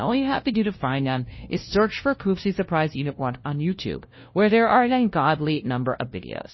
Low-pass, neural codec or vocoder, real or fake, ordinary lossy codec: 7.2 kHz; codec, 16 kHz, 0.5 kbps, FunCodec, trained on LibriTTS, 25 frames a second; fake; MP3, 24 kbps